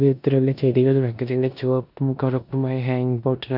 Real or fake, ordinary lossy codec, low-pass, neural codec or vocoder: fake; none; 5.4 kHz; codec, 16 kHz in and 24 kHz out, 0.9 kbps, LongCat-Audio-Codec, four codebook decoder